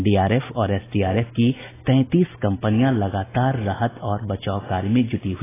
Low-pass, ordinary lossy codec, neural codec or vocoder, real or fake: 3.6 kHz; AAC, 16 kbps; none; real